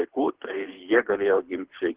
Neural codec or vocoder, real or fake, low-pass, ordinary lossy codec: codec, 24 kHz, 6 kbps, HILCodec; fake; 3.6 kHz; Opus, 16 kbps